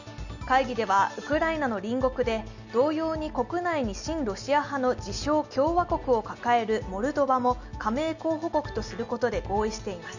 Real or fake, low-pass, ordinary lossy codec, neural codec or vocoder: real; 7.2 kHz; none; none